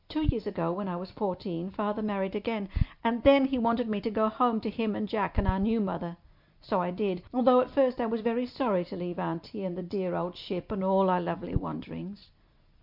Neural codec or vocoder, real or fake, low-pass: none; real; 5.4 kHz